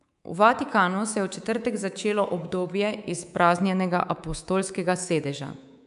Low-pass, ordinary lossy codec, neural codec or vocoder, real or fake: 10.8 kHz; none; codec, 24 kHz, 3.1 kbps, DualCodec; fake